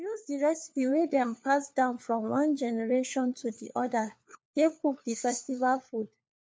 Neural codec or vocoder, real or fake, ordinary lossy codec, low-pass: codec, 16 kHz, 4 kbps, FunCodec, trained on LibriTTS, 50 frames a second; fake; none; none